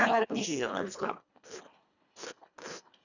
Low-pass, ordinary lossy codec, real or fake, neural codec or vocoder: 7.2 kHz; none; fake; codec, 24 kHz, 1.5 kbps, HILCodec